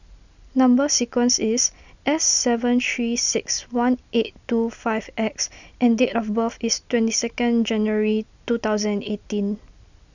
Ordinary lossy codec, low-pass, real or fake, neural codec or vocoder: none; 7.2 kHz; real; none